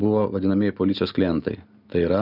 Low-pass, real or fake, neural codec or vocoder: 5.4 kHz; real; none